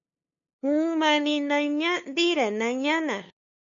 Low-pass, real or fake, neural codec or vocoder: 7.2 kHz; fake; codec, 16 kHz, 2 kbps, FunCodec, trained on LibriTTS, 25 frames a second